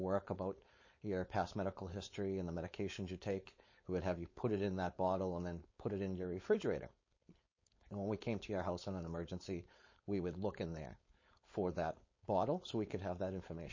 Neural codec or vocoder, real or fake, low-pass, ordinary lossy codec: codec, 16 kHz, 4.8 kbps, FACodec; fake; 7.2 kHz; MP3, 32 kbps